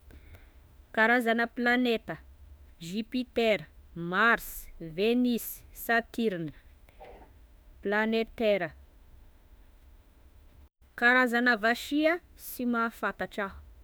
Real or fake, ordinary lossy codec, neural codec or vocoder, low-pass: fake; none; autoencoder, 48 kHz, 32 numbers a frame, DAC-VAE, trained on Japanese speech; none